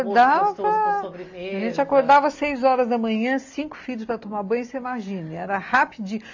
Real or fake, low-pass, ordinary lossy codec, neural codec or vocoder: real; 7.2 kHz; AAC, 48 kbps; none